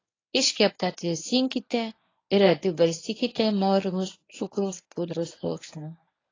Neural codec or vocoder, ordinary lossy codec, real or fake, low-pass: codec, 24 kHz, 0.9 kbps, WavTokenizer, medium speech release version 1; AAC, 32 kbps; fake; 7.2 kHz